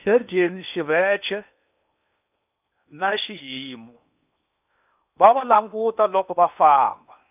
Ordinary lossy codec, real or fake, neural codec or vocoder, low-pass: none; fake; codec, 16 kHz in and 24 kHz out, 0.8 kbps, FocalCodec, streaming, 65536 codes; 3.6 kHz